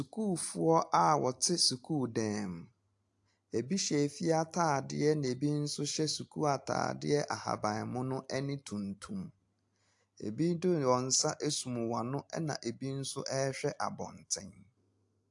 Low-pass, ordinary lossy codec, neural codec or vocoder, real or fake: 10.8 kHz; AAC, 64 kbps; none; real